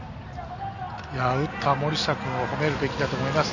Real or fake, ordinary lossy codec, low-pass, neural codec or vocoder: real; none; 7.2 kHz; none